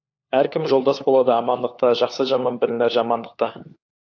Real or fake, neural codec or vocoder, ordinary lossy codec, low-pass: fake; codec, 16 kHz, 4 kbps, FunCodec, trained on LibriTTS, 50 frames a second; AAC, 48 kbps; 7.2 kHz